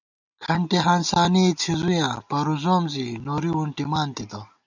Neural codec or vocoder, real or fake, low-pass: none; real; 7.2 kHz